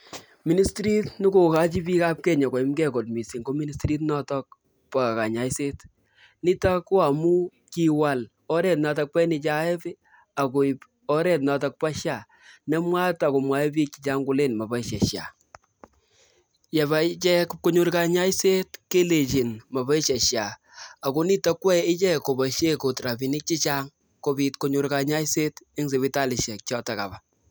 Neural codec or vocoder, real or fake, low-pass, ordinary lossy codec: none; real; none; none